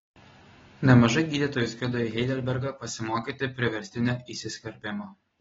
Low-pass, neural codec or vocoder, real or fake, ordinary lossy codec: 7.2 kHz; none; real; AAC, 24 kbps